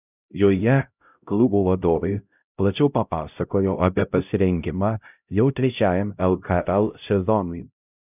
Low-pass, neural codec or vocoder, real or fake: 3.6 kHz; codec, 16 kHz, 0.5 kbps, X-Codec, HuBERT features, trained on LibriSpeech; fake